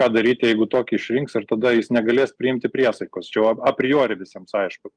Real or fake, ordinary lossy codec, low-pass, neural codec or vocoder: real; Opus, 32 kbps; 9.9 kHz; none